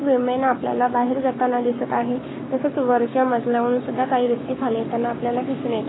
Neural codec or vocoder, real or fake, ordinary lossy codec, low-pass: codec, 44.1 kHz, 7.8 kbps, Pupu-Codec; fake; AAC, 16 kbps; 7.2 kHz